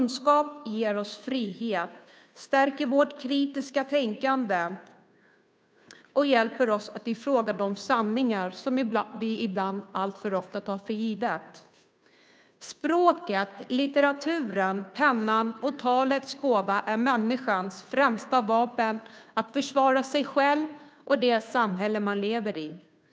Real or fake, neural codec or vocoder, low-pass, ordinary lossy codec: fake; codec, 16 kHz, 2 kbps, FunCodec, trained on Chinese and English, 25 frames a second; none; none